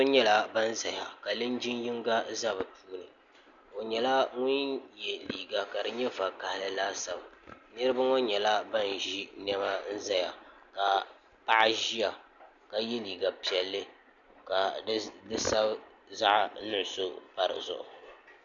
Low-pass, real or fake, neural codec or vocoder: 7.2 kHz; real; none